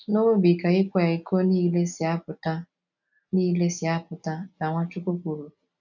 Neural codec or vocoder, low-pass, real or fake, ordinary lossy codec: none; 7.2 kHz; real; none